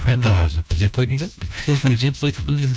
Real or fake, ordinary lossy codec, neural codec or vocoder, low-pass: fake; none; codec, 16 kHz, 1 kbps, FunCodec, trained on LibriTTS, 50 frames a second; none